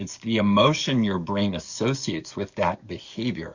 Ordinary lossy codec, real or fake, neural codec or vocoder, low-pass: Opus, 64 kbps; fake; codec, 44.1 kHz, 7.8 kbps, DAC; 7.2 kHz